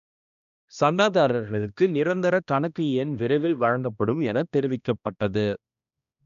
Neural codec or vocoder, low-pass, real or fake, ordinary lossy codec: codec, 16 kHz, 1 kbps, X-Codec, HuBERT features, trained on balanced general audio; 7.2 kHz; fake; none